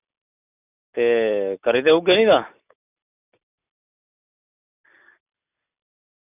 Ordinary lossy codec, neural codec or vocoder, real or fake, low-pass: none; none; real; 3.6 kHz